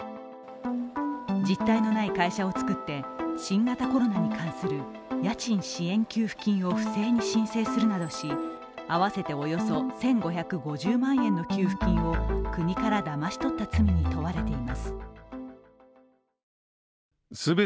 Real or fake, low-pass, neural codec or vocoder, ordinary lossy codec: real; none; none; none